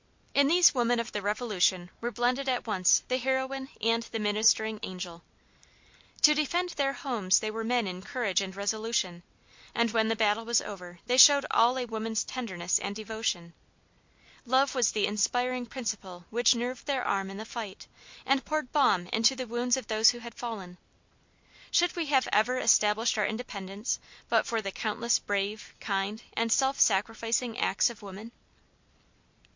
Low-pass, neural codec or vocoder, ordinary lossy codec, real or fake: 7.2 kHz; none; MP3, 48 kbps; real